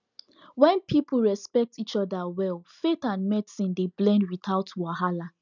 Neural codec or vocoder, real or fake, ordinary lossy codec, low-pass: none; real; none; 7.2 kHz